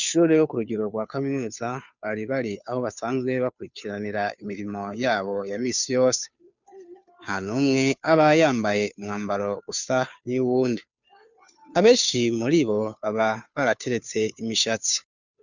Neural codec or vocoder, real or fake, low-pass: codec, 16 kHz, 2 kbps, FunCodec, trained on Chinese and English, 25 frames a second; fake; 7.2 kHz